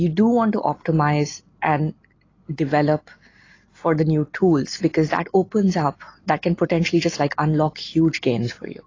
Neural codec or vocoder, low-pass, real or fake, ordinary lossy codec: none; 7.2 kHz; real; AAC, 32 kbps